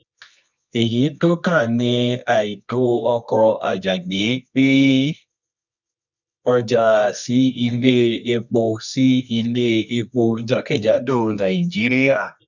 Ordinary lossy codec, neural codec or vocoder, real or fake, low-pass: none; codec, 24 kHz, 0.9 kbps, WavTokenizer, medium music audio release; fake; 7.2 kHz